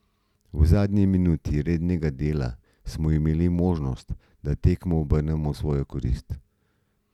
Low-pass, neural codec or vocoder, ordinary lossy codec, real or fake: 19.8 kHz; none; none; real